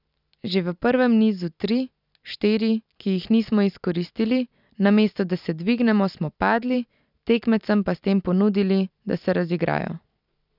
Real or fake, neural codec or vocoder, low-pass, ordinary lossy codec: real; none; 5.4 kHz; none